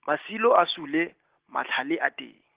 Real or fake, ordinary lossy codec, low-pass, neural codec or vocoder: real; Opus, 16 kbps; 3.6 kHz; none